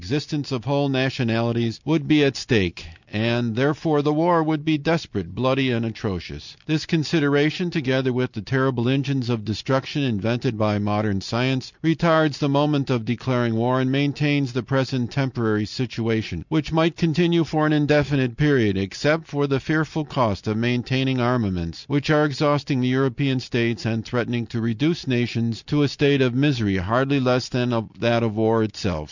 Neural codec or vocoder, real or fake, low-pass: none; real; 7.2 kHz